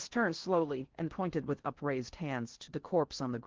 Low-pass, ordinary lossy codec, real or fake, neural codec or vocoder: 7.2 kHz; Opus, 16 kbps; fake; codec, 16 kHz in and 24 kHz out, 0.6 kbps, FocalCodec, streaming, 4096 codes